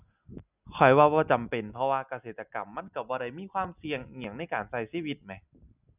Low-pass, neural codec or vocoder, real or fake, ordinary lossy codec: 3.6 kHz; none; real; none